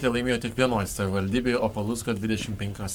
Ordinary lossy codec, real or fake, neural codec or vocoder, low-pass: MP3, 96 kbps; fake; codec, 44.1 kHz, 7.8 kbps, Pupu-Codec; 19.8 kHz